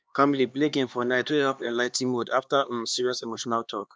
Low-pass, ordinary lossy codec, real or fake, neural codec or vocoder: none; none; fake; codec, 16 kHz, 2 kbps, X-Codec, HuBERT features, trained on LibriSpeech